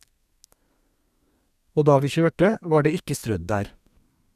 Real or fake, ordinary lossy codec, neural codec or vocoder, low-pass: fake; none; codec, 32 kHz, 1.9 kbps, SNAC; 14.4 kHz